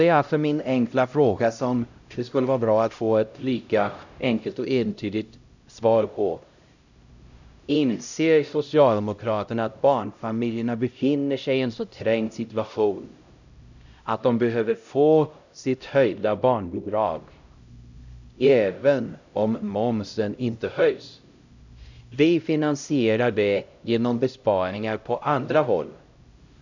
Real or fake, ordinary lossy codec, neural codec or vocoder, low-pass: fake; none; codec, 16 kHz, 0.5 kbps, X-Codec, HuBERT features, trained on LibriSpeech; 7.2 kHz